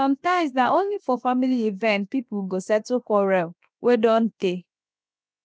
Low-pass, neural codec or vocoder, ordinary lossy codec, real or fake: none; codec, 16 kHz, 0.7 kbps, FocalCodec; none; fake